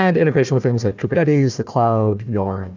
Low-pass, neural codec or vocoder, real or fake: 7.2 kHz; codec, 16 kHz, 1 kbps, FunCodec, trained on Chinese and English, 50 frames a second; fake